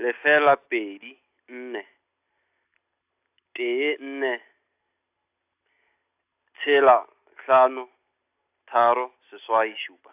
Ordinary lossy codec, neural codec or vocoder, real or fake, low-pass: AAC, 32 kbps; none; real; 3.6 kHz